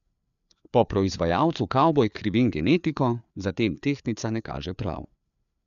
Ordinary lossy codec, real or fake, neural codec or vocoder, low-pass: none; fake; codec, 16 kHz, 4 kbps, FreqCodec, larger model; 7.2 kHz